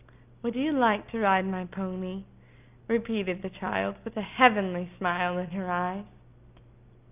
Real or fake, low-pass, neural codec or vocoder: real; 3.6 kHz; none